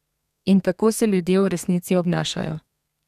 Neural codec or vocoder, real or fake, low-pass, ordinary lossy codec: codec, 32 kHz, 1.9 kbps, SNAC; fake; 14.4 kHz; none